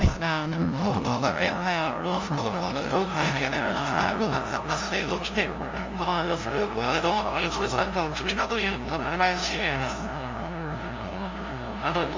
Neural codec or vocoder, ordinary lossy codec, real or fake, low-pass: codec, 16 kHz, 0.5 kbps, FunCodec, trained on LibriTTS, 25 frames a second; MP3, 64 kbps; fake; 7.2 kHz